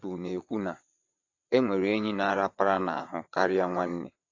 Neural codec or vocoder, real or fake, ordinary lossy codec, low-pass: vocoder, 22.05 kHz, 80 mel bands, WaveNeXt; fake; AAC, 48 kbps; 7.2 kHz